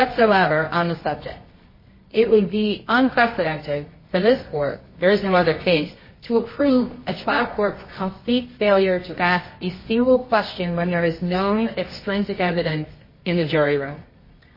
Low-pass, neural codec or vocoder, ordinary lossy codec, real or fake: 5.4 kHz; codec, 24 kHz, 0.9 kbps, WavTokenizer, medium music audio release; MP3, 24 kbps; fake